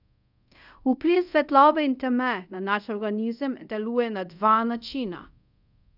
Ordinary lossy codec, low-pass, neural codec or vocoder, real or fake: none; 5.4 kHz; codec, 24 kHz, 0.5 kbps, DualCodec; fake